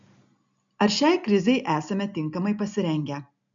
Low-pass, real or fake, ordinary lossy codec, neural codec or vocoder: 7.2 kHz; real; MP3, 64 kbps; none